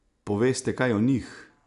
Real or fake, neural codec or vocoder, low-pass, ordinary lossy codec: real; none; 10.8 kHz; none